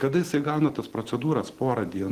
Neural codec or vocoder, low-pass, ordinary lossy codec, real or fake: none; 14.4 kHz; Opus, 16 kbps; real